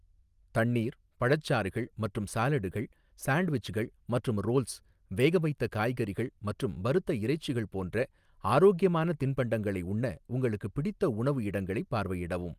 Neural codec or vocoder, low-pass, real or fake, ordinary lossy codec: none; 14.4 kHz; real; Opus, 32 kbps